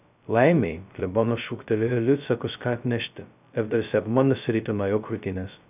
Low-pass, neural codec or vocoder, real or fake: 3.6 kHz; codec, 16 kHz, 0.2 kbps, FocalCodec; fake